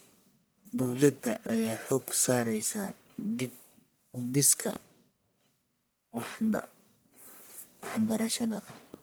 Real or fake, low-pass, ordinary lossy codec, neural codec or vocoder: fake; none; none; codec, 44.1 kHz, 1.7 kbps, Pupu-Codec